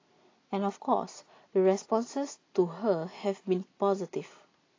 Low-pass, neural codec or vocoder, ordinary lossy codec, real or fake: 7.2 kHz; none; AAC, 32 kbps; real